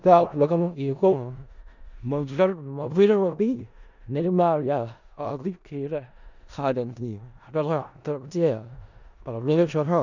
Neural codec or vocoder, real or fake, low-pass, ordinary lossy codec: codec, 16 kHz in and 24 kHz out, 0.4 kbps, LongCat-Audio-Codec, four codebook decoder; fake; 7.2 kHz; none